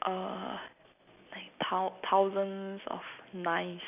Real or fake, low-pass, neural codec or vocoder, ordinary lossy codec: real; 3.6 kHz; none; none